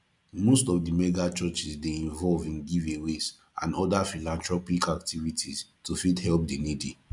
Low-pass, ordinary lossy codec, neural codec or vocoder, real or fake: 10.8 kHz; none; none; real